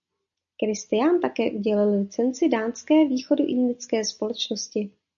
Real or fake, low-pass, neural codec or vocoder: real; 7.2 kHz; none